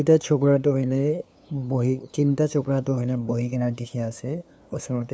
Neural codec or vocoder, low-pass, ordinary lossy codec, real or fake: codec, 16 kHz, 2 kbps, FunCodec, trained on LibriTTS, 25 frames a second; none; none; fake